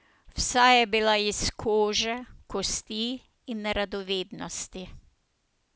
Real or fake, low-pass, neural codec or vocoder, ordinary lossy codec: real; none; none; none